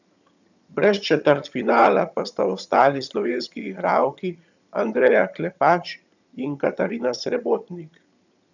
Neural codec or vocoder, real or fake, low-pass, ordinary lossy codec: vocoder, 22.05 kHz, 80 mel bands, HiFi-GAN; fake; 7.2 kHz; none